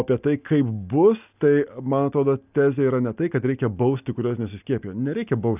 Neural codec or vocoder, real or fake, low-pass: none; real; 3.6 kHz